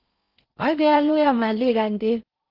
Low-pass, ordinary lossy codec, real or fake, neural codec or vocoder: 5.4 kHz; Opus, 24 kbps; fake; codec, 16 kHz in and 24 kHz out, 0.6 kbps, FocalCodec, streaming, 4096 codes